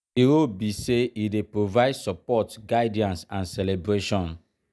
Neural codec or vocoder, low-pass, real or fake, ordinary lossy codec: none; none; real; none